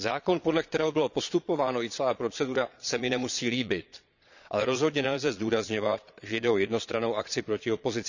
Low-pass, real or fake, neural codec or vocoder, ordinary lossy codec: 7.2 kHz; fake; vocoder, 22.05 kHz, 80 mel bands, Vocos; none